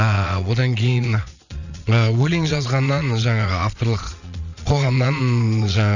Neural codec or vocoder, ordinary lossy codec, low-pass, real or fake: vocoder, 22.05 kHz, 80 mel bands, Vocos; none; 7.2 kHz; fake